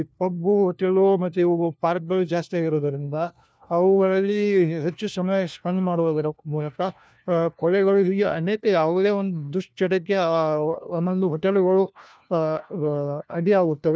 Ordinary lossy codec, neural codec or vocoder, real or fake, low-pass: none; codec, 16 kHz, 1 kbps, FunCodec, trained on LibriTTS, 50 frames a second; fake; none